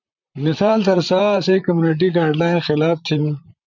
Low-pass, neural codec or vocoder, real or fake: 7.2 kHz; vocoder, 44.1 kHz, 128 mel bands, Pupu-Vocoder; fake